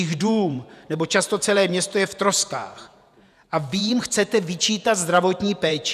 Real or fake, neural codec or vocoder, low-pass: fake; vocoder, 48 kHz, 128 mel bands, Vocos; 14.4 kHz